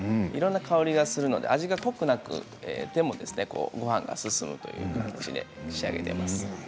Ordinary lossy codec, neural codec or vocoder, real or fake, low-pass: none; none; real; none